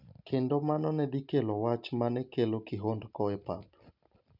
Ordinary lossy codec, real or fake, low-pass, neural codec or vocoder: none; real; 5.4 kHz; none